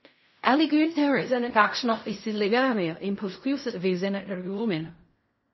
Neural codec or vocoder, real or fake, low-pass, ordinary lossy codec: codec, 16 kHz in and 24 kHz out, 0.4 kbps, LongCat-Audio-Codec, fine tuned four codebook decoder; fake; 7.2 kHz; MP3, 24 kbps